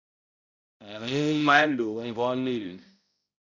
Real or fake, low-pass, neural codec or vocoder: fake; 7.2 kHz; codec, 16 kHz, 0.5 kbps, X-Codec, HuBERT features, trained on balanced general audio